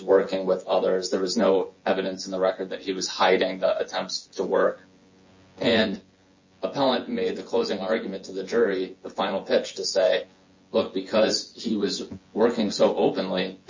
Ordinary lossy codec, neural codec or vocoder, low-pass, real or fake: MP3, 32 kbps; vocoder, 24 kHz, 100 mel bands, Vocos; 7.2 kHz; fake